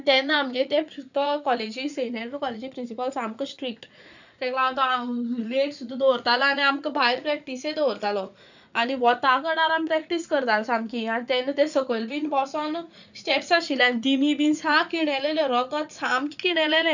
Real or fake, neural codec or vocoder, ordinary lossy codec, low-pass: fake; vocoder, 44.1 kHz, 80 mel bands, Vocos; none; 7.2 kHz